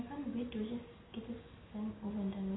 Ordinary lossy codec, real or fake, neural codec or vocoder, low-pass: AAC, 16 kbps; real; none; 7.2 kHz